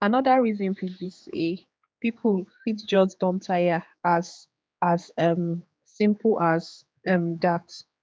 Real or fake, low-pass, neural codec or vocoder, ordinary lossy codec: fake; none; codec, 16 kHz, 4 kbps, X-Codec, HuBERT features, trained on general audio; none